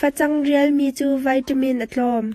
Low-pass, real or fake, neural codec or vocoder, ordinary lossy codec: 14.4 kHz; fake; vocoder, 48 kHz, 128 mel bands, Vocos; AAC, 64 kbps